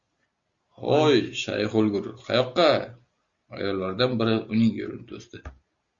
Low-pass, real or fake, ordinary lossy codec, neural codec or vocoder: 7.2 kHz; real; Opus, 64 kbps; none